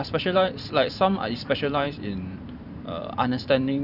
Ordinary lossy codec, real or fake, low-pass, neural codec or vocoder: none; fake; 5.4 kHz; vocoder, 44.1 kHz, 128 mel bands every 512 samples, BigVGAN v2